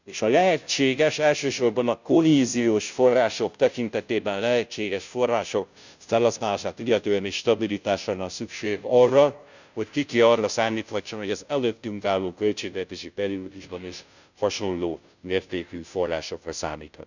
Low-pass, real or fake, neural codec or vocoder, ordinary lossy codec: 7.2 kHz; fake; codec, 16 kHz, 0.5 kbps, FunCodec, trained on Chinese and English, 25 frames a second; none